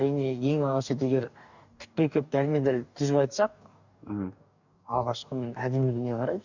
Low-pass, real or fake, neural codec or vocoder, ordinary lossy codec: 7.2 kHz; fake; codec, 44.1 kHz, 2.6 kbps, DAC; none